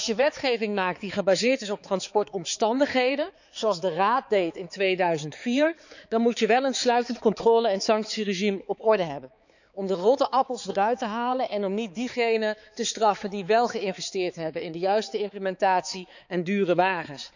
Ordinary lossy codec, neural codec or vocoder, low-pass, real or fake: none; codec, 16 kHz, 4 kbps, X-Codec, HuBERT features, trained on balanced general audio; 7.2 kHz; fake